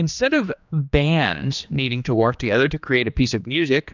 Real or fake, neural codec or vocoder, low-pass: fake; codec, 16 kHz, 2 kbps, X-Codec, HuBERT features, trained on general audio; 7.2 kHz